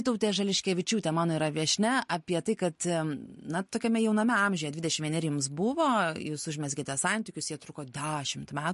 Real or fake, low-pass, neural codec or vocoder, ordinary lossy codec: real; 14.4 kHz; none; MP3, 48 kbps